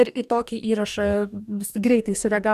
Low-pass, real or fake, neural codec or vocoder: 14.4 kHz; fake; codec, 44.1 kHz, 2.6 kbps, DAC